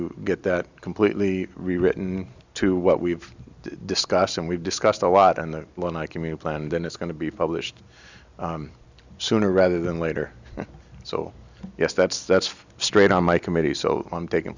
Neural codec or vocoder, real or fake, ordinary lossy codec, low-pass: none; real; Opus, 64 kbps; 7.2 kHz